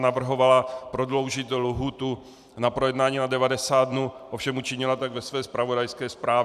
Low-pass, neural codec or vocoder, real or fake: 14.4 kHz; vocoder, 44.1 kHz, 128 mel bands every 512 samples, BigVGAN v2; fake